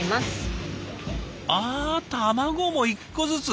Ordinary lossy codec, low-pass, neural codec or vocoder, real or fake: none; none; none; real